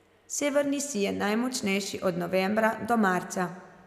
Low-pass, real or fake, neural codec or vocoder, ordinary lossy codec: 14.4 kHz; real; none; none